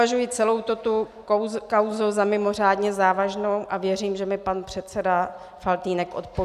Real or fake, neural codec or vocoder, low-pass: real; none; 14.4 kHz